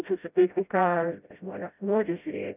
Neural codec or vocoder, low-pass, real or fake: codec, 16 kHz, 0.5 kbps, FreqCodec, smaller model; 3.6 kHz; fake